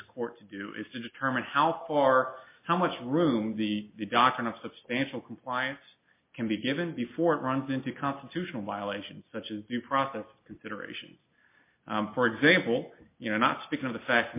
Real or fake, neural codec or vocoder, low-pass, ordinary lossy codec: real; none; 3.6 kHz; MP3, 24 kbps